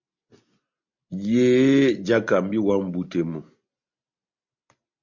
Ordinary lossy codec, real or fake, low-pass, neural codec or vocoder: MP3, 48 kbps; real; 7.2 kHz; none